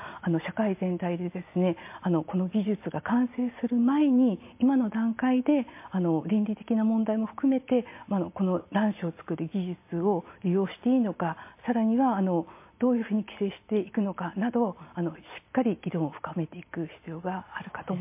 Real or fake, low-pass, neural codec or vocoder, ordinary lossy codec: real; 3.6 kHz; none; MP3, 24 kbps